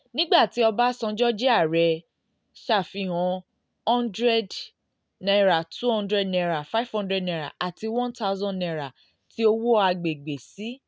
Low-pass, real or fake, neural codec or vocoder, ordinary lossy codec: none; real; none; none